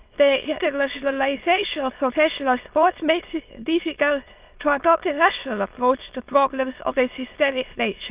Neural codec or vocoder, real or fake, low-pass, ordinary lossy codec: autoencoder, 22.05 kHz, a latent of 192 numbers a frame, VITS, trained on many speakers; fake; 3.6 kHz; Opus, 32 kbps